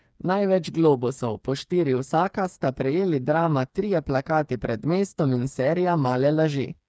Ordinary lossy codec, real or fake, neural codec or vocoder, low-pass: none; fake; codec, 16 kHz, 4 kbps, FreqCodec, smaller model; none